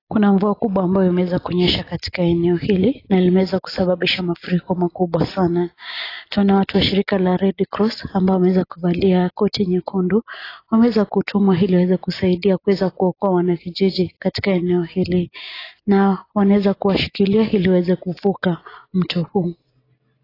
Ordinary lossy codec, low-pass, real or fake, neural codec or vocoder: AAC, 24 kbps; 5.4 kHz; real; none